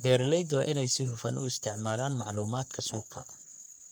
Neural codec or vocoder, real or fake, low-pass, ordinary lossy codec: codec, 44.1 kHz, 3.4 kbps, Pupu-Codec; fake; none; none